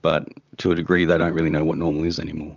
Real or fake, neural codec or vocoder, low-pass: fake; vocoder, 44.1 kHz, 128 mel bands, Pupu-Vocoder; 7.2 kHz